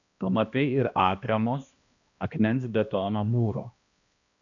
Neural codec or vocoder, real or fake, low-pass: codec, 16 kHz, 1 kbps, X-Codec, HuBERT features, trained on balanced general audio; fake; 7.2 kHz